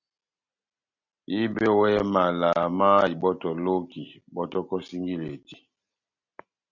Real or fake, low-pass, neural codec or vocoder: real; 7.2 kHz; none